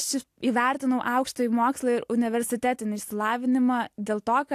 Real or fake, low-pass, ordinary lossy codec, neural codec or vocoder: real; 14.4 kHz; AAC, 64 kbps; none